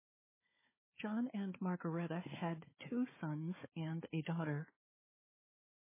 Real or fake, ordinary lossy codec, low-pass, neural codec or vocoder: fake; MP3, 16 kbps; 3.6 kHz; codec, 16 kHz, 4 kbps, FunCodec, trained on Chinese and English, 50 frames a second